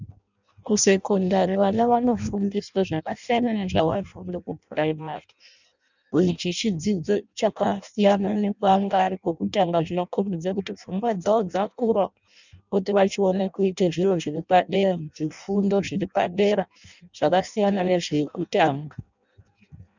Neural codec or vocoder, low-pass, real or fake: codec, 16 kHz in and 24 kHz out, 0.6 kbps, FireRedTTS-2 codec; 7.2 kHz; fake